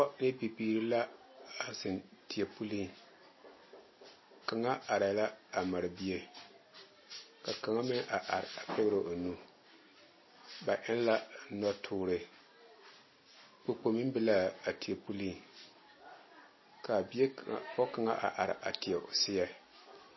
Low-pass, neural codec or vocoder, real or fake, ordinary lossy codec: 7.2 kHz; none; real; MP3, 24 kbps